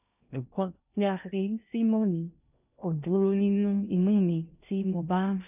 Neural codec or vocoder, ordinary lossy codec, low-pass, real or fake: codec, 16 kHz in and 24 kHz out, 0.6 kbps, FocalCodec, streaming, 2048 codes; none; 3.6 kHz; fake